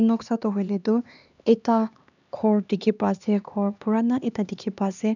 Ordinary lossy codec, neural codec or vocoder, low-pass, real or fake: none; codec, 16 kHz, 2 kbps, X-Codec, HuBERT features, trained on LibriSpeech; 7.2 kHz; fake